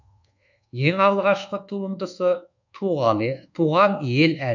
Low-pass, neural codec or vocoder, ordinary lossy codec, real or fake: 7.2 kHz; codec, 24 kHz, 1.2 kbps, DualCodec; none; fake